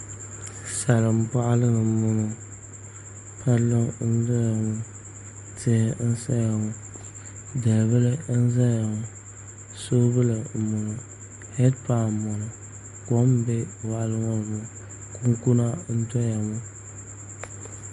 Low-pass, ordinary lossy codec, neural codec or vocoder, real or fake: 14.4 kHz; MP3, 48 kbps; none; real